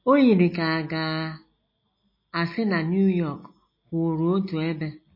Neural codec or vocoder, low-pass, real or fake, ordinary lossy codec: none; 5.4 kHz; real; MP3, 24 kbps